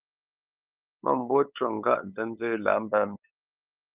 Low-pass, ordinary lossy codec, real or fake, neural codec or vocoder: 3.6 kHz; Opus, 32 kbps; fake; codec, 16 kHz, 8 kbps, FunCodec, trained on LibriTTS, 25 frames a second